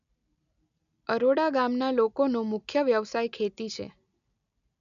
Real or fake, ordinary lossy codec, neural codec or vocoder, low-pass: real; none; none; 7.2 kHz